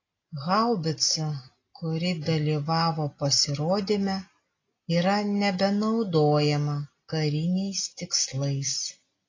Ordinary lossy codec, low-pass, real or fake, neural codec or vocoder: AAC, 32 kbps; 7.2 kHz; real; none